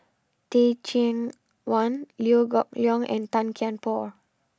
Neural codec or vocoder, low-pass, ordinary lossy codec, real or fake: none; none; none; real